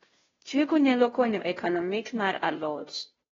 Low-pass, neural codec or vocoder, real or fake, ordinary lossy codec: 7.2 kHz; codec, 16 kHz, 0.5 kbps, FunCodec, trained on Chinese and English, 25 frames a second; fake; AAC, 24 kbps